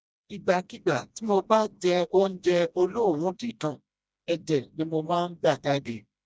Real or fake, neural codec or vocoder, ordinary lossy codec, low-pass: fake; codec, 16 kHz, 1 kbps, FreqCodec, smaller model; none; none